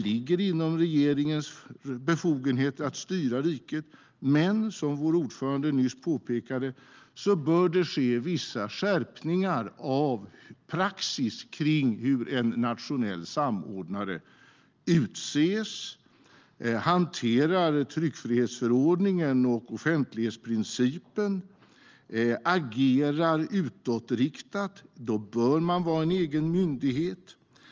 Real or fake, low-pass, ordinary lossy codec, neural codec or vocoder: real; 7.2 kHz; Opus, 24 kbps; none